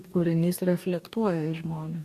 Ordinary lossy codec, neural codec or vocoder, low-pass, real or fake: AAC, 64 kbps; codec, 44.1 kHz, 2.6 kbps, DAC; 14.4 kHz; fake